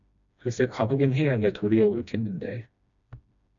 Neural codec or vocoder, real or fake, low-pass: codec, 16 kHz, 1 kbps, FreqCodec, smaller model; fake; 7.2 kHz